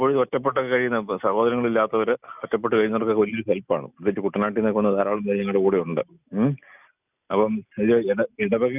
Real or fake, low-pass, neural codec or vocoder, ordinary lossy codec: real; 3.6 kHz; none; none